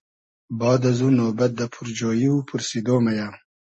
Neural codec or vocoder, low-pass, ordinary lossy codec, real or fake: none; 10.8 kHz; MP3, 32 kbps; real